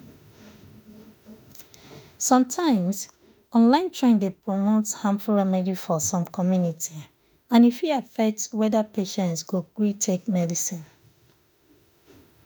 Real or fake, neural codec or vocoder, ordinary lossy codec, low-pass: fake; autoencoder, 48 kHz, 32 numbers a frame, DAC-VAE, trained on Japanese speech; none; none